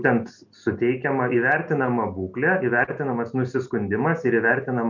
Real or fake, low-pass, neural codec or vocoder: real; 7.2 kHz; none